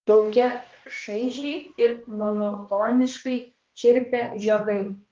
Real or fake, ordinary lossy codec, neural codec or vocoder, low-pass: fake; Opus, 32 kbps; codec, 16 kHz, 1 kbps, X-Codec, HuBERT features, trained on balanced general audio; 7.2 kHz